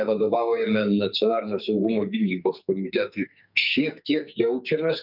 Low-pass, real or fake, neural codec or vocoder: 5.4 kHz; fake; codec, 44.1 kHz, 2.6 kbps, SNAC